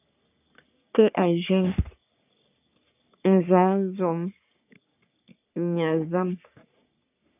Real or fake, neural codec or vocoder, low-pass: fake; codec, 44.1 kHz, 3.4 kbps, Pupu-Codec; 3.6 kHz